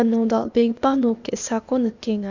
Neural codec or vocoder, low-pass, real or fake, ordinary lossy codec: codec, 16 kHz, 0.8 kbps, ZipCodec; 7.2 kHz; fake; none